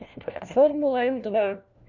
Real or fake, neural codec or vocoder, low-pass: fake; codec, 16 kHz, 1 kbps, FunCodec, trained on LibriTTS, 50 frames a second; 7.2 kHz